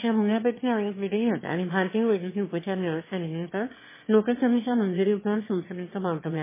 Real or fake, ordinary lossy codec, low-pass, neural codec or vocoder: fake; MP3, 16 kbps; 3.6 kHz; autoencoder, 22.05 kHz, a latent of 192 numbers a frame, VITS, trained on one speaker